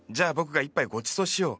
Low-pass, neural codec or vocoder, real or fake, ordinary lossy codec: none; none; real; none